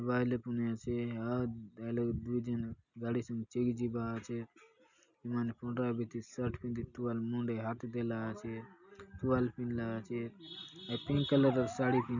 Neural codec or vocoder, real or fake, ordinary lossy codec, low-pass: none; real; none; 7.2 kHz